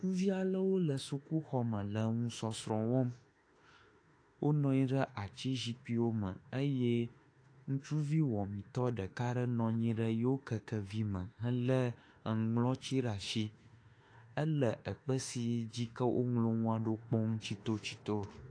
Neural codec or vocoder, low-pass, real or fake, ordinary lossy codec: autoencoder, 48 kHz, 32 numbers a frame, DAC-VAE, trained on Japanese speech; 9.9 kHz; fake; AAC, 48 kbps